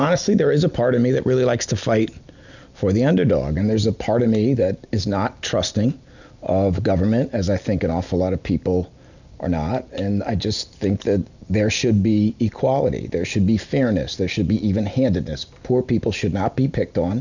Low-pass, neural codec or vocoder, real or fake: 7.2 kHz; none; real